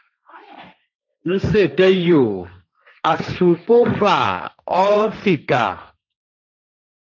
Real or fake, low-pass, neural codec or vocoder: fake; 7.2 kHz; codec, 16 kHz, 1.1 kbps, Voila-Tokenizer